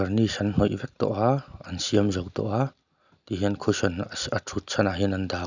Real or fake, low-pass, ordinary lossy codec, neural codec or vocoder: real; 7.2 kHz; none; none